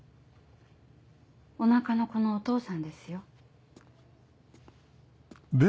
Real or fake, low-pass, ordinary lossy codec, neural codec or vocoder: real; none; none; none